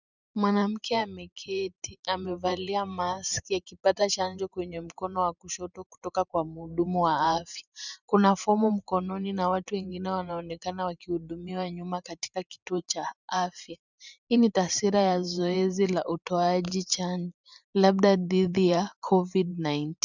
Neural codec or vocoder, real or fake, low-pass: vocoder, 44.1 kHz, 128 mel bands every 512 samples, BigVGAN v2; fake; 7.2 kHz